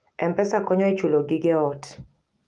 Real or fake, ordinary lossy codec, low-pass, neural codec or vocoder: fake; Opus, 24 kbps; 10.8 kHz; autoencoder, 48 kHz, 128 numbers a frame, DAC-VAE, trained on Japanese speech